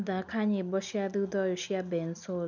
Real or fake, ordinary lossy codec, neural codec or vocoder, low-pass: real; none; none; 7.2 kHz